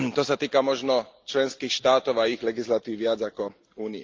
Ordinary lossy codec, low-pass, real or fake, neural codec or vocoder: Opus, 32 kbps; 7.2 kHz; real; none